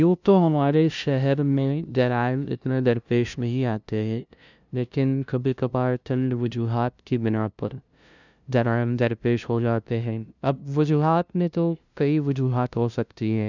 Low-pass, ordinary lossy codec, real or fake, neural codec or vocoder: 7.2 kHz; none; fake; codec, 16 kHz, 0.5 kbps, FunCodec, trained on LibriTTS, 25 frames a second